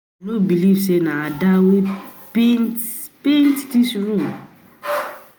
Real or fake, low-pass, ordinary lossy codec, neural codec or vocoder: real; none; none; none